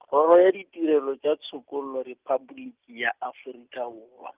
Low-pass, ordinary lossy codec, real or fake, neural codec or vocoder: 3.6 kHz; Opus, 32 kbps; real; none